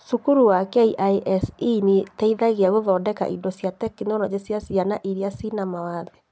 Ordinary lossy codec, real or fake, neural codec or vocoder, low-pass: none; real; none; none